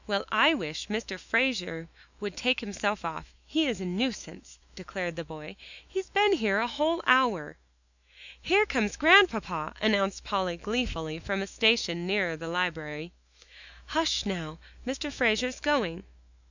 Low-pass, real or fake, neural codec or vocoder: 7.2 kHz; fake; autoencoder, 48 kHz, 128 numbers a frame, DAC-VAE, trained on Japanese speech